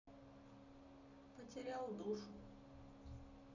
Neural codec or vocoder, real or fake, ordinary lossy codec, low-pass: none; real; none; 7.2 kHz